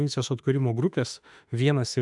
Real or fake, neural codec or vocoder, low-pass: fake; autoencoder, 48 kHz, 32 numbers a frame, DAC-VAE, trained on Japanese speech; 10.8 kHz